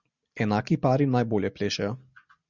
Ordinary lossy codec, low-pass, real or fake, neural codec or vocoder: Opus, 64 kbps; 7.2 kHz; real; none